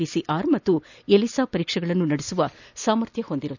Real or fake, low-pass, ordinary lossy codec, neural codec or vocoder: real; 7.2 kHz; none; none